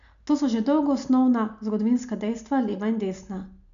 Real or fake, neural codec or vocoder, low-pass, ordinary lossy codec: real; none; 7.2 kHz; none